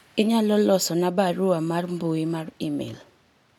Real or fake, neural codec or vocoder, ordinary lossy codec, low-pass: real; none; none; 19.8 kHz